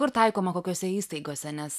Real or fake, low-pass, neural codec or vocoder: real; 14.4 kHz; none